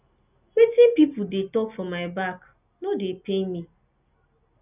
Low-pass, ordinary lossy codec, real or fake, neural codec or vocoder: 3.6 kHz; none; real; none